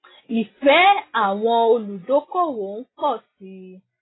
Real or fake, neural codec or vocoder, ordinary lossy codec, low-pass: real; none; AAC, 16 kbps; 7.2 kHz